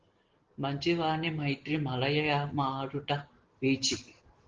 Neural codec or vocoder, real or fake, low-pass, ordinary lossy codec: none; real; 7.2 kHz; Opus, 16 kbps